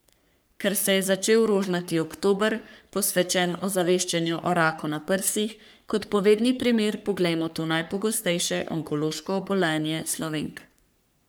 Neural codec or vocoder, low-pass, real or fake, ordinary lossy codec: codec, 44.1 kHz, 3.4 kbps, Pupu-Codec; none; fake; none